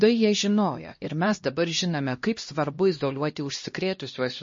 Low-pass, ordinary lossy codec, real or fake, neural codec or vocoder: 7.2 kHz; MP3, 32 kbps; fake; codec, 16 kHz, 1 kbps, X-Codec, HuBERT features, trained on LibriSpeech